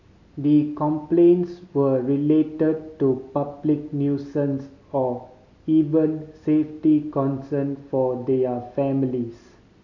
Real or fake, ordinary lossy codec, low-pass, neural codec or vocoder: real; none; 7.2 kHz; none